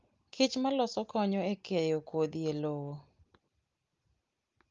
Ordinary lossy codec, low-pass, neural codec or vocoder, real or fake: Opus, 32 kbps; 7.2 kHz; none; real